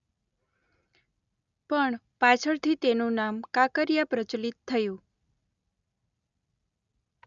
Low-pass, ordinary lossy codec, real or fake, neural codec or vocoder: 7.2 kHz; none; real; none